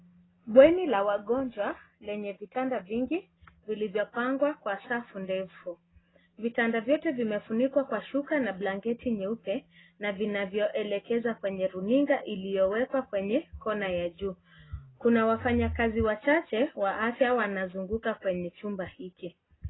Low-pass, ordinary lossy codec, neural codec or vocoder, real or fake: 7.2 kHz; AAC, 16 kbps; none; real